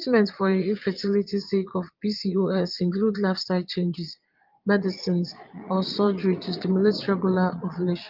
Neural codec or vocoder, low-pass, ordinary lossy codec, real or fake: vocoder, 22.05 kHz, 80 mel bands, Vocos; 5.4 kHz; Opus, 24 kbps; fake